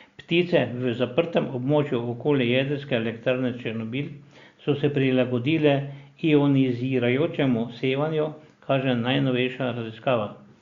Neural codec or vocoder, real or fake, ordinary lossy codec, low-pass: none; real; Opus, 64 kbps; 7.2 kHz